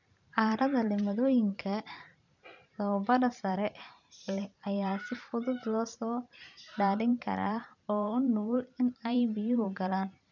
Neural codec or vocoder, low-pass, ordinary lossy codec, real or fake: vocoder, 44.1 kHz, 128 mel bands every 512 samples, BigVGAN v2; 7.2 kHz; none; fake